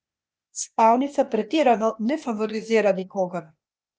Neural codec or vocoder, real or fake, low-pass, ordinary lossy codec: codec, 16 kHz, 0.8 kbps, ZipCodec; fake; none; none